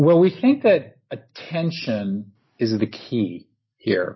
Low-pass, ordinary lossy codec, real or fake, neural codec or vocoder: 7.2 kHz; MP3, 24 kbps; real; none